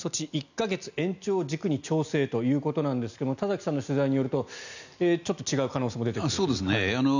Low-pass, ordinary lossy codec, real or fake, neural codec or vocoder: 7.2 kHz; none; real; none